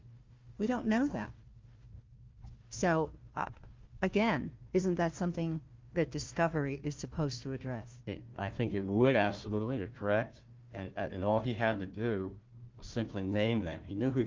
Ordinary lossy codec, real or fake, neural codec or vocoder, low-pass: Opus, 32 kbps; fake; codec, 16 kHz, 1 kbps, FunCodec, trained on Chinese and English, 50 frames a second; 7.2 kHz